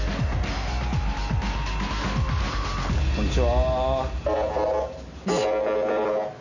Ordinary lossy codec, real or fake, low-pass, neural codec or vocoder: none; real; 7.2 kHz; none